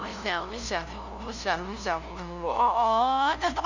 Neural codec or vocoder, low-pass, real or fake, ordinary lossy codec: codec, 16 kHz, 0.5 kbps, FunCodec, trained on LibriTTS, 25 frames a second; 7.2 kHz; fake; none